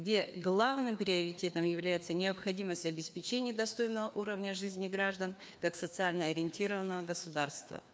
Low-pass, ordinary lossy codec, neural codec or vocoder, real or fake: none; none; codec, 16 kHz, 2 kbps, FreqCodec, larger model; fake